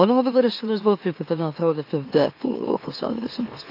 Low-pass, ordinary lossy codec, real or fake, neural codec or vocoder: 5.4 kHz; AAC, 32 kbps; fake; autoencoder, 44.1 kHz, a latent of 192 numbers a frame, MeloTTS